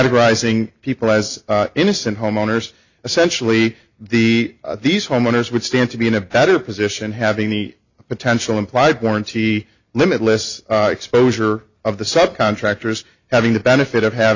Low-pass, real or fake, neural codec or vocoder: 7.2 kHz; real; none